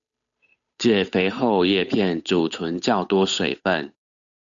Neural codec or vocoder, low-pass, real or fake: codec, 16 kHz, 8 kbps, FunCodec, trained on Chinese and English, 25 frames a second; 7.2 kHz; fake